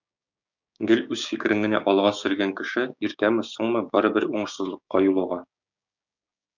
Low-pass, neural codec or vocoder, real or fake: 7.2 kHz; codec, 16 kHz, 6 kbps, DAC; fake